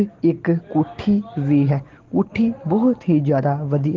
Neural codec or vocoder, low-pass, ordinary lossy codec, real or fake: none; 7.2 kHz; Opus, 16 kbps; real